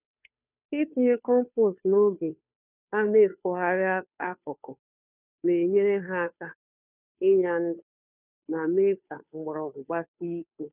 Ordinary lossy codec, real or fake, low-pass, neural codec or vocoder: none; fake; 3.6 kHz; codec, 16 kHz, 2 kbps, FunCodec, trained on Chinese and English, 25 frames a second